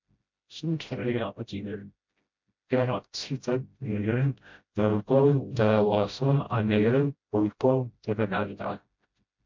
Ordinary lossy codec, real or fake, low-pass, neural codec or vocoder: MP3, 48 kbps; fake; 7.2 kHz; codec, 16 kHz, 0.5 kbps, FreqCodec, smaller model